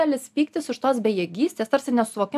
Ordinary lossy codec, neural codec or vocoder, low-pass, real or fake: AAC, 96 kbps; none; 14.4 kHz; real